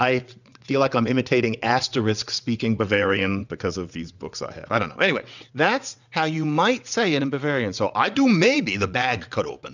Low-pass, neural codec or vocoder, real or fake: 7.2 kHz; vocoder, 22.05 kHz, 80 mel bands, WaveNeXt; fake